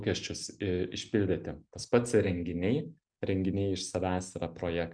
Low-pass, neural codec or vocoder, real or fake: 9.9 kHz; none; real